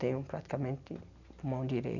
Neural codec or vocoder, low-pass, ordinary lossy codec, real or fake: none; 7.2 kHz; none; real